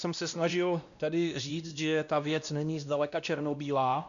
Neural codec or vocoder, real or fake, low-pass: codec, 16 kHz, 1 kbps, X-Codec, WavLM features, trained on Multilingual LibriSpeech; fake; 7.2 kHz